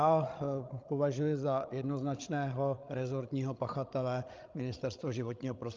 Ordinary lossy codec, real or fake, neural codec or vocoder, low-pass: Opus, 24 kbps; fake; codec, 16 kHz, 16 kbps, FreqCodec, larger model; 7.2 kHz